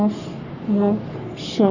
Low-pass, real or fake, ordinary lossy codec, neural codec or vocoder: 7.2 kHz; fake; none; codec, 44.1 kHz, 3.4 kbps, Pupu-Codec